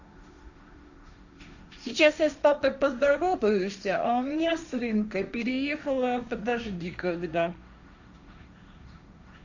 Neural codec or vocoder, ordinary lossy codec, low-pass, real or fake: codec, 16 kHz, 1.1 kbps, Voila-Tokenizer; none; 7.2 kHz; fake